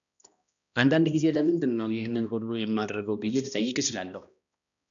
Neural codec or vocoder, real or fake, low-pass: codec, 16 kHz, 1 kbps, X-Codec, HuBERT features, trained on balanced general audio; fake; 7.2 kHz